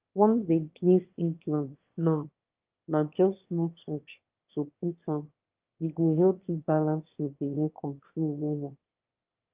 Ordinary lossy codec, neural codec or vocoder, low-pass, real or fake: Opus, 24 kbps; autoencoder, 22.05 kHz, a latent of 192 numbers a frame, VITS, trained on one speaker; 3.6 kHz; fake